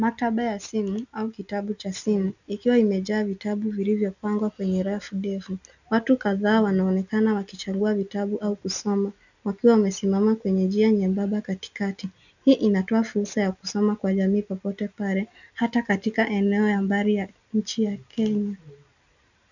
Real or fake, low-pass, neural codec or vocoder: real; 7.2 kHz; none